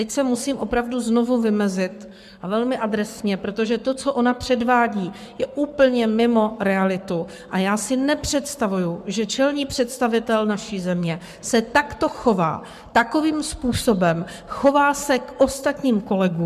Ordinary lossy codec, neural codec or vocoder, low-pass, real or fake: MP3, 96 kbps; codec, 44.1 kHz, 7.8 kbps, Pupu-Codec; 14.4 kHz; fake